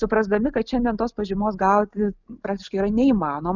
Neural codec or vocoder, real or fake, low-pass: none; real; 7.2 kHz